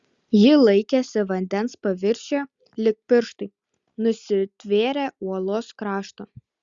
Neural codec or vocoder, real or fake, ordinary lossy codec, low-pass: none; real; Opus, 64 kbps; 7.2 kHz